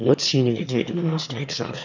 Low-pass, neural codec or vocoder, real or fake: 7.2 kHz; autoencoder, 22.05 kHz, a latent of 192 numbers a frame, VITS, trained on one speaker; fake